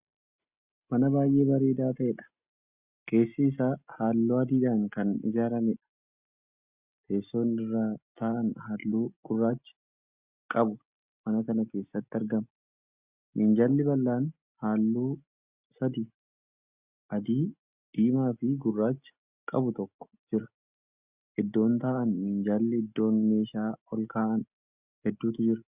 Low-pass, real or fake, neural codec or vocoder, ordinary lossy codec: 3.6 kHz; real; none; Opus, 64 kbps